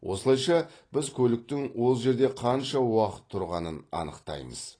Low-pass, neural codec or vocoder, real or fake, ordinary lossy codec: 9.9 kHz; none; real; AAC, 32 kbps